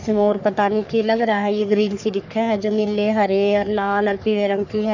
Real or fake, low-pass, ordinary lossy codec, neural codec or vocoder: fake; 7.2 kHz; none; codec, 44.1 kHz, 3.4 kbps, Pupu-Codec